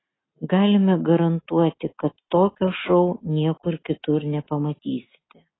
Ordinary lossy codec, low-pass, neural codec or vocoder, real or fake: AAC, 16 kbps; 7.2 kHz; none; real